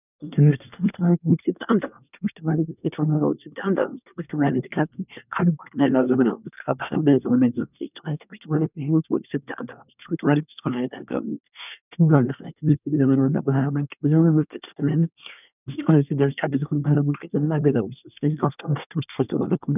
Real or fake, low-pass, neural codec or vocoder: fake; 3.6 kHz; codec, 24 kHz, 1 kbps, SNAC